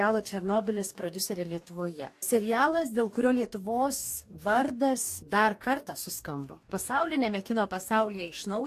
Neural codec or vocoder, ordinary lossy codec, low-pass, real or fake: codec, 44.1 kHz, 2.6 kbps, DAC; AAC, 64 kbps; 14.4 kHz; fake